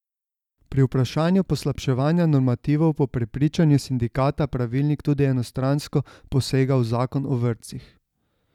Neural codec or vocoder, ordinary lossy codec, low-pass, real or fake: none; none; 19.8 kHz; real